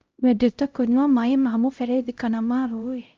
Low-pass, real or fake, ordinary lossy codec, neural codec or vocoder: 7.2 kHz; fake; Opus, 24 kbps; codec, 16 kHz, 0.5 kbps, X-Codec, HuBERT features, trained on LibriSpeech